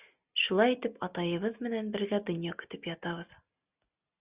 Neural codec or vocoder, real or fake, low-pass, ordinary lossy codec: none; real; 3.6 kHz; Opus, 64 kbps